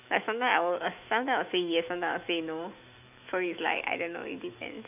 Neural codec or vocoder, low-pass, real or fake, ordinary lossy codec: autoencoder, 48 kHz, 128 numbers a frame, DAC-VAE, trained on Japanese speech; 3.6 kHz; fake; none